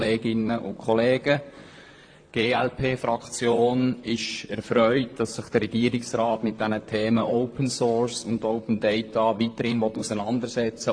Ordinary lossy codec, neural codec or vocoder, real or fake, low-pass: AAC, 48 kbps; vocoder, 44.1 kHz, 128 mel bands, Pupu-Vocoder; fake; 9.9 kHz